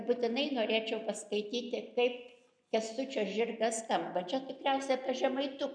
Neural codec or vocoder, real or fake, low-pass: none; real; 9.9 kHz